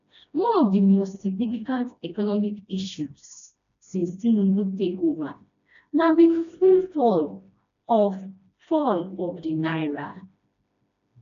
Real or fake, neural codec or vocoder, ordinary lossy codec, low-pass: fake; codec, 16 kHz, 1 kbps, FreqCodec, smaller model; AAC, 96 kbps; 7.2 kHz